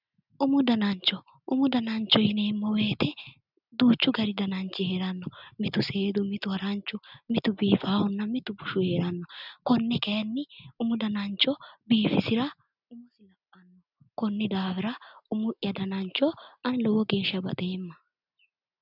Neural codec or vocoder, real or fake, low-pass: none; real; 5.4 kHz